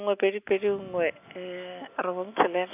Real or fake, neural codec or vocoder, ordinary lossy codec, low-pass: real; none; AAC, 32 kbps; 3.6 kHz